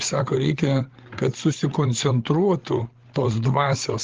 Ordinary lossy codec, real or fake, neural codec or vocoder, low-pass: Opus, 16 kbps; fake; codec, 16 kHz, 8 kbps, FreqCodec, larger model; 7.2 kHz